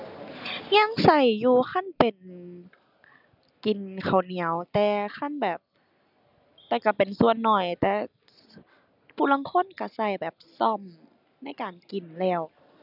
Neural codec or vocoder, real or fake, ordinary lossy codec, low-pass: none; real; none; 5.4 kHz